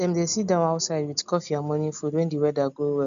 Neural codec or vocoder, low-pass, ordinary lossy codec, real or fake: none; 7.2 kHz; none; real